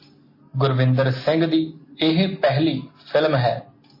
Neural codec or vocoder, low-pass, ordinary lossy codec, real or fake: none; 5.4 kHz; MP3, 24 kbps; real